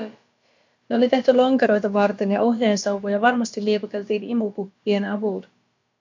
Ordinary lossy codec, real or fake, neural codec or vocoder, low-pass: MP3, 48 kbps; fake; codec, 16 kHz, about 1 kbps, DyCAST, with the encoder's durations; 7.2 kHz